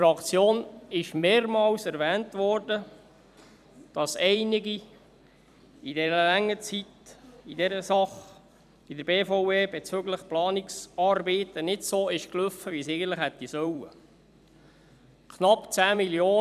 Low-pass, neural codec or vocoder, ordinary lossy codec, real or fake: 14.4 kHz; vocoder, 44.1 kHz, 128 mel bands every 256 samples, BigVGAN v2; none; fake